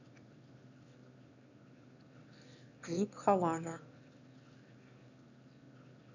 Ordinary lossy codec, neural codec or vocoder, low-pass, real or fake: none; autoencoder, 22.05 kHz, a latent of 192 numbers a frame, VITS, trained on one speaker; 7.2 kHz; fake